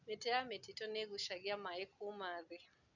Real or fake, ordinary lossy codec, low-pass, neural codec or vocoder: real; none; 7.2 kHz; none